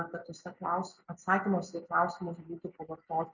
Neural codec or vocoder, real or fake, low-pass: none; real; 7.2 kHz